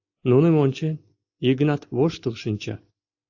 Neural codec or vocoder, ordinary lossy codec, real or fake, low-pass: none; AAC, 48 kbps; real; 7.2 kHz